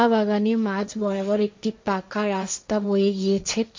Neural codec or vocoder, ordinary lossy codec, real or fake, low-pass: codec, 16 kHz, 1.1 kbps, Voila-Tokenizer; MP3, 48 kbps; fake; 7.2 kHz